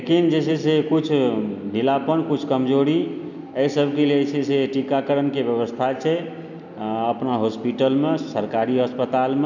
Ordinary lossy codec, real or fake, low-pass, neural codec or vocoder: none; real; 7.2 kHz; none